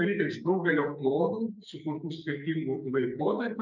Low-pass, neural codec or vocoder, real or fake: 7.2 kHz; codec, 44.1 kHz, 2.6 kbps, SNAC; fake